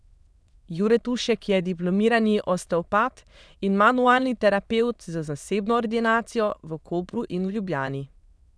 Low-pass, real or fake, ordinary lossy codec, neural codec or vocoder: none; fake; none; autoencoder, 22.05 kHz, a latent of 192 numbers a frame, VITS, trained on many speakers